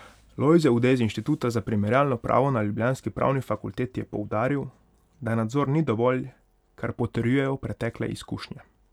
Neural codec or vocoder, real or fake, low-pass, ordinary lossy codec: none; real; 19.8 kHz; none